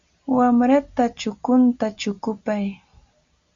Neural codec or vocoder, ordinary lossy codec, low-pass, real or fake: none; Opus, 64 kbps; 7.2 kHz; real